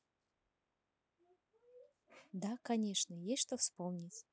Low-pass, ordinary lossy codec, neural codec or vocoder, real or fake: none; none; none; real